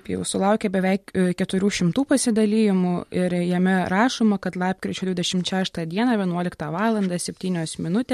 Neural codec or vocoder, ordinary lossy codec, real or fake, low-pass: none; MP3, 64 kbps; real; 14.4 kHz